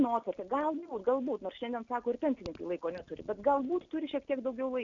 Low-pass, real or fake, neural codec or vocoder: 7.2 kHz; real; none